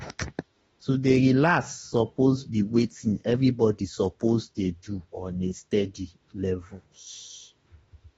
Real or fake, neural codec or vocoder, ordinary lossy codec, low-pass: fake; autoencoder, 48 kHz, 32 numbers a frame, DAC-VAE, trained on Japanese speech; AAC, 24 kbps; 19.8 kHz